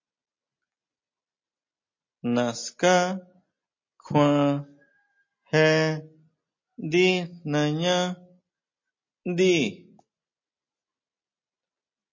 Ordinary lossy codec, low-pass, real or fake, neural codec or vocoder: MP3, 32 kbps; 7.2 kHz; real; none